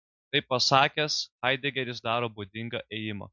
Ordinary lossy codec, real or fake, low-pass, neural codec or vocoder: MP3, 64 kbps; real; 7.2 kHz; none